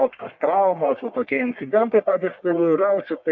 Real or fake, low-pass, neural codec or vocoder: fake; 7.2 kHz; codec, 44.1 kHz, 1.7 kbps, Pupu-Codec